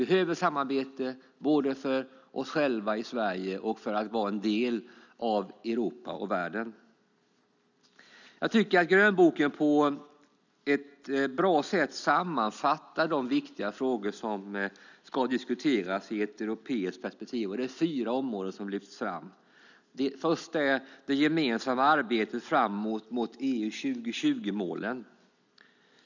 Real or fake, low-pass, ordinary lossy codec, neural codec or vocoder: real; 7.2 kHz; none; none